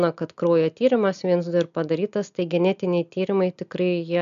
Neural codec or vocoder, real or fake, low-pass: none; real; 7.2 kHz